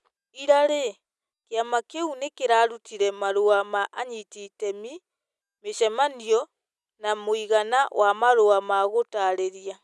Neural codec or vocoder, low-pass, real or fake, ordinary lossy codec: none; none; real; none